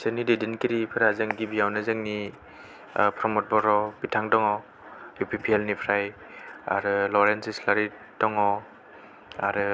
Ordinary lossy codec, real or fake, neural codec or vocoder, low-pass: none; real; none; none